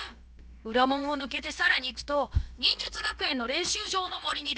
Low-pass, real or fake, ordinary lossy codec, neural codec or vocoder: none; fake; none; codec, 16 kHz, about 1 kbps, DyCAST, with the encoder's durations